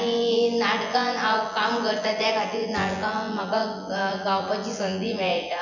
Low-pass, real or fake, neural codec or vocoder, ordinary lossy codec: 7.2 kHz; fake; vocoder, 24 kHz, 100 mel bands, Vocos; none